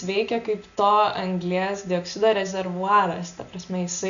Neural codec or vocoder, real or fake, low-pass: none; real; 7.2 kHz